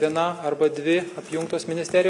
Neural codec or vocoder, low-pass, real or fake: none; 10.8 kHz; real